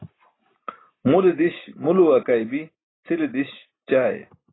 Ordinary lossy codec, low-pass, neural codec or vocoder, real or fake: AAC, 16 kbps; 7.2 kHz; none; real